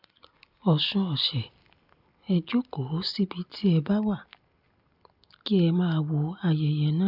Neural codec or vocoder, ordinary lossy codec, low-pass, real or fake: none; none; 5.4 kHz; real